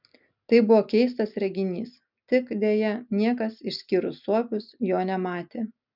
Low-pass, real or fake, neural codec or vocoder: 5.4 kHz; real; none